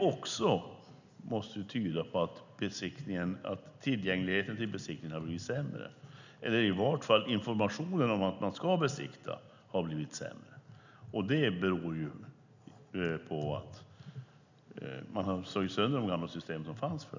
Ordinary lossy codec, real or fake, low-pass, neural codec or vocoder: none; real; 7.2 kHz; none